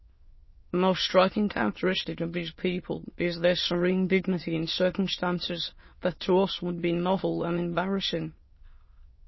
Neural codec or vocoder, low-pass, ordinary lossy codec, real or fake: autoencoder, 22.05 kHz, a latent of 192 numbers a frame, VITS, trained on many speakers; 7.2 kHz; MP3, 24 kbps; fake